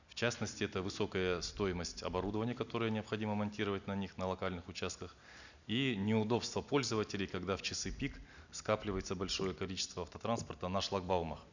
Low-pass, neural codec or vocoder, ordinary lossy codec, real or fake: 7.2 kHz; none; none; real